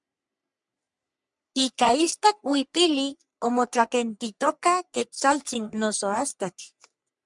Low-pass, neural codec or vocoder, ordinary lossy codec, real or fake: 10.8 kHz; codec, 44.1 kHz, 3.4 kbps, Pupu-Codec; MP3, 96 kbps; fake